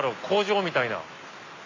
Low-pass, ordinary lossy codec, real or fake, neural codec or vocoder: 7.2 kHz; AAC, 32 kbps; real; none